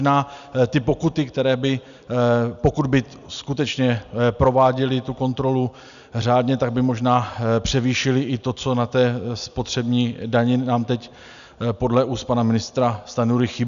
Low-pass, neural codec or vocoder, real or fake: 7.2 kHz; none; real